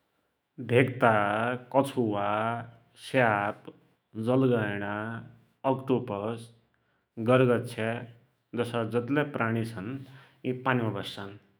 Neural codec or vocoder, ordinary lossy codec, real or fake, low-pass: autoencoder, 48 kHz, 128 numbers a frame, DAC-VAE, trained on Japanese speech; none; fake; none